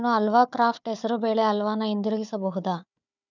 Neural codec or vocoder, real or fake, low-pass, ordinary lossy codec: codec, 16 kHz, 4 kbps, FunCodec, trained on Chinese and English, 50 frames a second; fake; 7.2 kHz; none